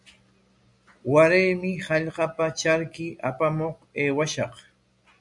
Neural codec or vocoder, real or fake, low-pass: none; real; 10.8 kHz